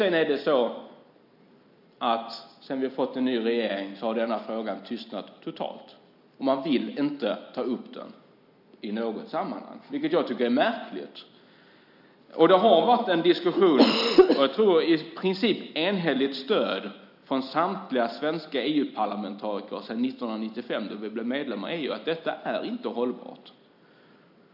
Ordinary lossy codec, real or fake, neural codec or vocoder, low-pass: none; real; none; 5.4 kHz